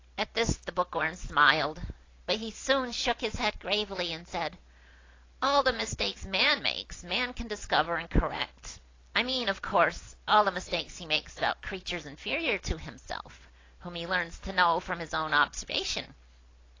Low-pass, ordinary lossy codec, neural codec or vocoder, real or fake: 7.2 kHz; AAC, 32 kbps; none; real